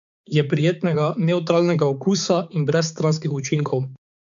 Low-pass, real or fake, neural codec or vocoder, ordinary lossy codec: 7.2 kHz; fake; codec, 16 kHz, 4 kbps, X-Codec, HuBERT features, trained on balanced general audio; none